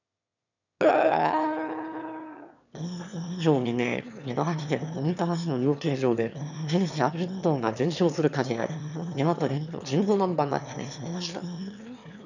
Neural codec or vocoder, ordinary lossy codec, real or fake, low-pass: autoencoder, 22.05 kHz, a latent of 192 numbers a frame, VITS, trained on one speaker; none; fake; 7.2 kHz